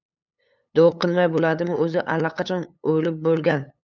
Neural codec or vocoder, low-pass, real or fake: codec, 16 kHz, 8 kbps, FunCodec, trained on LibriTTS, 25 frames a second; 7.2 kHz; fake